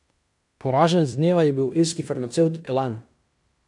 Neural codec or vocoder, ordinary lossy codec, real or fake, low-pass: codec, 16 kHz in and 24 kHz out, 0.9 kbps, LongCat-Audio-Codec, fine tuned four codebook decoder; none; fake; 10.8 kHz